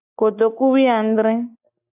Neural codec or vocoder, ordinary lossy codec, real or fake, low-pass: none; AAC, 24 kbps; real; 3.6 kHz